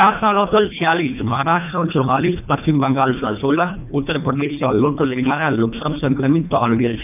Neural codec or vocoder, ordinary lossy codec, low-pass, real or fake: codec, 24 kHz, 1.5 kbps, HILCodec; none; 3.6 kHz; fake